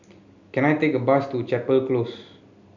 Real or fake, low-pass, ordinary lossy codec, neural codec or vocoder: real; 7.2 kHz; none; none